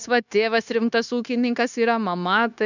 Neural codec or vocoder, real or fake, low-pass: codec, 16 kHz, 0.9 kbps, LongCat-Audio-Codec; fake; 7.2 kHz